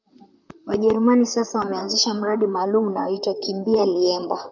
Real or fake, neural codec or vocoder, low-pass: fake; vocoder, 44.1 kHz, 128 mel bands, Pupu-Vocoder; 7.2 kHz